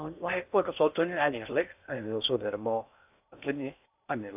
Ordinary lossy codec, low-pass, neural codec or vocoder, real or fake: AAC, 32 kbps; 3.6 kHz; codec, 16 kHz in and 24 kHz out, 0.6 kbps, FocalCodec, streaming, 4096 codes; fake